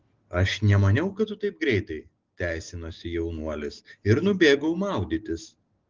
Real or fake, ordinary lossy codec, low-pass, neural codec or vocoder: real; Opus, 16 kbps; 7.2 kHz; none